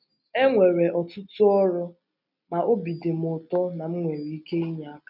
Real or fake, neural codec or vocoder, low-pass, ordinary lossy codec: real; none; 5.4 kHz; none